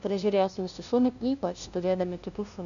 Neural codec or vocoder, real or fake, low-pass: codec, 16 kHz, 0.5 kbps, FunCodec, trained on LibriTTS, 25 frames a second; fake; 7.2 kHz